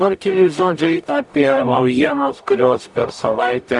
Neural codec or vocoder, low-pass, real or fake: codec, 44.1 kHz, 0.9 kbps, DAC; 10.8 kHz; fake